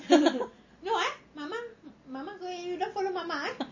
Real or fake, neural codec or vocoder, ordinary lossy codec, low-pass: real; none; MP3, 32 kbps; 7.2 kHz